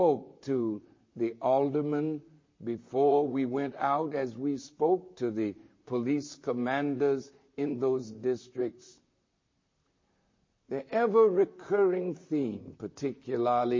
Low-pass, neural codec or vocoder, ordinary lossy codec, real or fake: 7.2 kHz; vocoder, 44.1 kHz, 128 mel bands, Pupu-Vocoder; MP3, 32 kbps; fake